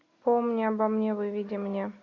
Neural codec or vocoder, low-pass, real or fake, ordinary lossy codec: none; 7.2 kHz; real; AAC, 48 kbps